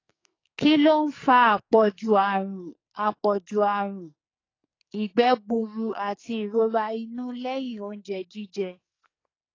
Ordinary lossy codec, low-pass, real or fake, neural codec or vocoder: AAC, 32 kbps; 7.2 kHz; fake; codec, 44.1 kHz, 2.6 kbps, SNAC